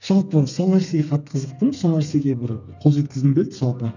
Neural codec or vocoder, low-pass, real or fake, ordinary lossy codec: codec, 32 kHz, 1.9 kbps, SNAC; 7.2 kHz; fake; none